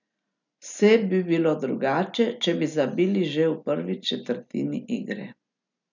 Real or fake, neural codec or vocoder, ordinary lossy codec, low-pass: real; none; none; 7.2 kHz